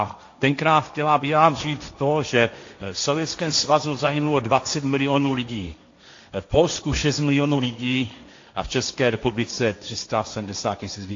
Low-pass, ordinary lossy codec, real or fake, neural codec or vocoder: 7.2 kHz; AAC, 48 kbps; fake; codec, 16 kHz, 1.1 kbps, Voila-Tokenizer